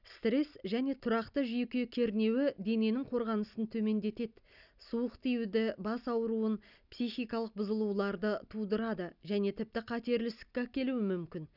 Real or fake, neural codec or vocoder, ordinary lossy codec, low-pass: real; none; none; 5.4 kHz